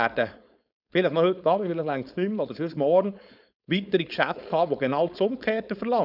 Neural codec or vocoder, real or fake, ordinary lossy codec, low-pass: codec, 16 kHz, 4.8 kbps, FACodec; fake; none; 5.4 kHz